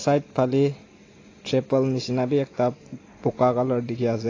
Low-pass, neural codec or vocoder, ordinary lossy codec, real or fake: 7.2 kHz; none; AAC, 32 kbps; real